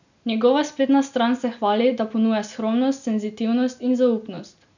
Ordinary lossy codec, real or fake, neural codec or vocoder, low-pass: none; real; none; 7.2 kHz